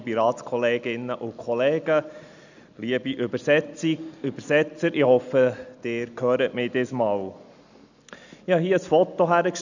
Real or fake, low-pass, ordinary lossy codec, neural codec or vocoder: real; 7.2 kHz; none; none